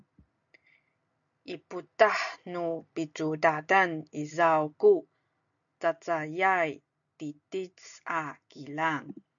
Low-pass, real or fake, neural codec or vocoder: 7.2 kHz; real; none